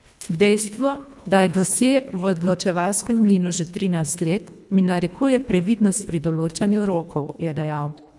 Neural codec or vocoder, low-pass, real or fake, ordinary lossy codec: codec, 24 kHz, 1.5 kbps, HILCodec; none; fake; none